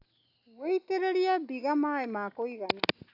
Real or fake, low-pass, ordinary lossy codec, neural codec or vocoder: real; 5.4 kHz; AAC, 48 kbps; none